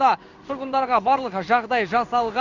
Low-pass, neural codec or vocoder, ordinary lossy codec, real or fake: 7.2 kHz; codec, 16 kHz in and 24 kHz out, 1 kbps, XY-Tokenizer; none; fake